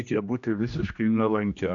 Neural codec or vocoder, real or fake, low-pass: codec, 16 kHz, 1 kbps, X-Codec, HuBERT features, trained on general audio; fake; 7.2 kHz